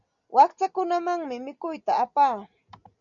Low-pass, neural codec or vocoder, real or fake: 7.2 kHz; none; real